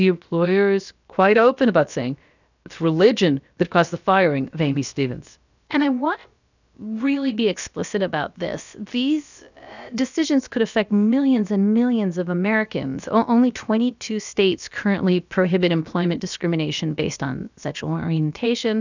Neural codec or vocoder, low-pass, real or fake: codec, 16 kHz, about 1 kbps, DyCAST, with the encoder's durations; 7.2 kHz; fake